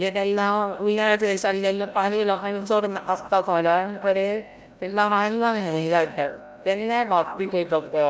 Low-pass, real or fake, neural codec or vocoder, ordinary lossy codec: none; fake; codec, 16 kHz, 0.5 kbps, FreqCodec, larger model; none